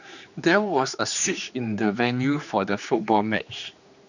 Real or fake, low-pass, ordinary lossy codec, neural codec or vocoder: fake; 7.2 kHz; none; codec, 16 kHz, 2 kbps, X-Codec, HuBERT features, trained on general audio